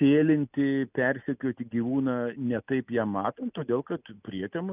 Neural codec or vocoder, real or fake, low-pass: none; real; 3.6 kHz